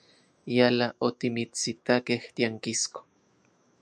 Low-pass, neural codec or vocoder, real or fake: 9.9 kHz; autoencoder, 48 kHz, 128 numbers a frame, DAC-VAE, trained on Japanese speech; fake